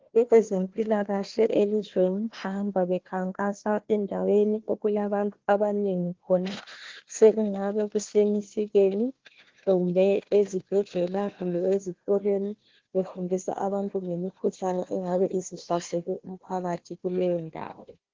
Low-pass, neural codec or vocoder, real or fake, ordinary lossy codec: 7.2 kHz; codec, 16 kHz, 1 kbps, FunCodec, trained on Chinese and English, 50 frames a second; fake; Opus, 16 kbps